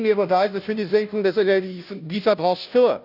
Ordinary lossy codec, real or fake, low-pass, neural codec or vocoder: none; fake; 5.4 kHz; codec, 16 kHz, 0.5 kbps, FunCodec, trained on Chinese and English, 25 frames a second